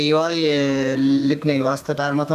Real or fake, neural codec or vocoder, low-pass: fake; codec, 32 kHz, 1.9 kbps, SNAC; 14.4 kHz